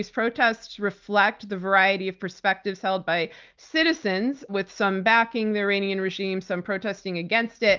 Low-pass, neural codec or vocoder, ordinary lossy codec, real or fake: 7.2 kHz; none; Opus, 24 kbps; real